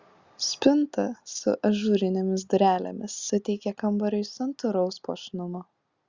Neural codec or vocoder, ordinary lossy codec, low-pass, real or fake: none; Opus, 64 kbps; 7.2 kHz; real